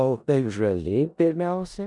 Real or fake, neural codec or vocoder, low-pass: fake; codec, 16 kHz in and 24 kHz out, 0.4 kbps, LongCat-Audio-Codec, four codebook decoder; 10.8 kHz